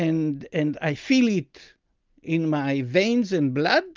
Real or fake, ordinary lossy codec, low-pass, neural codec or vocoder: real; Opus, 24 kbps; 7.2 kHz; none